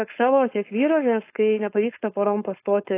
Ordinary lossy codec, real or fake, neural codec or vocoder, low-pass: AAC, 24 kbps; fake; codec, 16 kHz, 4.8 kbps, FACodec; 3.6 kHz